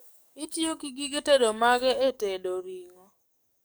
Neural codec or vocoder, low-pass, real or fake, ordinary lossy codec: codec, 44.1 kHz, 7.8 kbps, DAC; none; fake; none